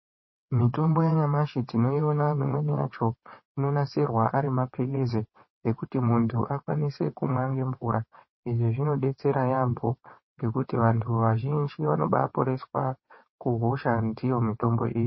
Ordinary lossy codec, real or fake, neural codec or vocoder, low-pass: MP3, 24 kbps; fake; vocoder, 22.05 kHz, 80 mel bands, WaveNeXt; 7.2 kHz